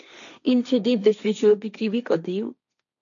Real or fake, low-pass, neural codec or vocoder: fake; 7.2 kHz; codec, 16 kHz, 1.1 kbps, Voila-Tokenizer